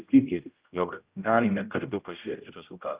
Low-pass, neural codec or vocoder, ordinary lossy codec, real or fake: 3.6 kHz; codec, 16 kHz, 0.5 kbps, X-Codec, HuBERT features, trained on general audio; Opus, 32 kbps; fake